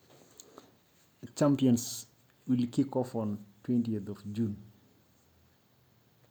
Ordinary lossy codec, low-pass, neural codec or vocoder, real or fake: none; none; none; real